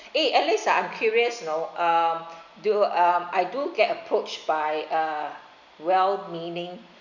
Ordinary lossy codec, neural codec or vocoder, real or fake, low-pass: none; none; real; 7.2 kHz